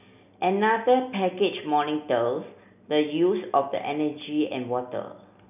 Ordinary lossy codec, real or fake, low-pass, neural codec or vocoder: none; real; 3.6 kHz; none